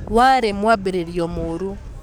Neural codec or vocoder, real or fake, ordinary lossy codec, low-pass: codec, 44.1 kHz, 7.8 kbps, DAC; fake; none; 19.8 kHz